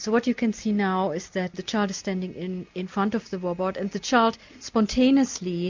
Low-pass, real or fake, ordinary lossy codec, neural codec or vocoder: 7.2 kHz; real; MP3, 64 kbps; none